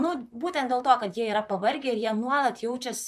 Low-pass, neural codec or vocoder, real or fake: 14.4 kHz; vocoder, 44.1 kHz, 128 mel bands, Pupu-Vocoder; fake